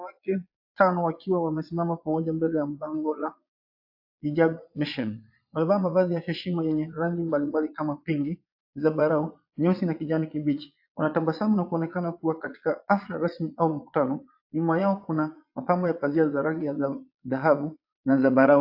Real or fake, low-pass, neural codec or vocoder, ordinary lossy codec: fake; 5.4 kHz; vocoder, 22.05 kHz, 80 mel bands, Vocos; AAC, 48 kbps